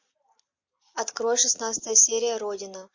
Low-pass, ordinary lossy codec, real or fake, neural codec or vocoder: 7.2 kHz; MP3, 48 kbps; real; none